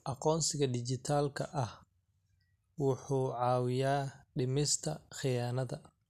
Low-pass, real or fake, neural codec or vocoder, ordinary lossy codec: 14.4 kHz; real; none; none